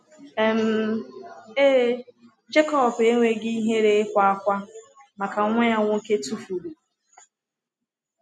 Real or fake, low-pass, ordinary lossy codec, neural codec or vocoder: real; none; none; none